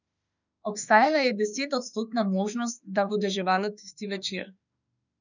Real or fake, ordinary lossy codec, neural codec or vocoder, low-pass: fake; none; autoencoder, 48 kHz, 32 numbers a frame, DAC-VAE, trained on Japanese speech; 7.2 kHz